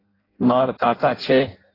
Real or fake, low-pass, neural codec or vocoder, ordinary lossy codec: fake; 5.4 kHz; codec, 16 kHz in and 24 kHz out, 0.6 kbps, FireRedTTS-2 codec; AAC, 24 kbps